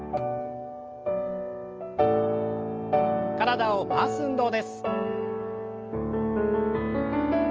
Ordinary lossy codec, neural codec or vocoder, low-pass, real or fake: Opus, 24 kbps; none; 7.2 kHz; real